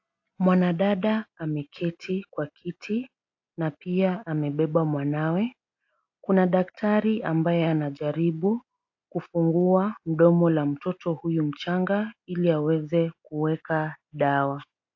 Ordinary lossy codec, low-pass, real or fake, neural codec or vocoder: AAC, 48 kbps; 7.2 kHz; real; none